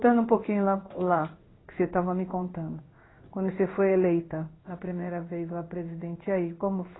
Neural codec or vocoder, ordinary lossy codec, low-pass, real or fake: codec, 16 kHz in and 24 kHz out, 1 kbps, XY-Tokenizer; AAC, 16 kbps; 7.2 kHz; fake